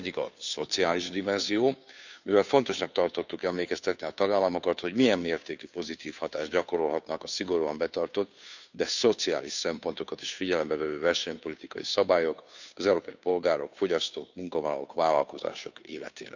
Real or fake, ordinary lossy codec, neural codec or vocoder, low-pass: fake; none; codec, 16 kHz, 2 kbps, FunCodec, trained on Chinese and English, 25 frames a second; 7.2 kHz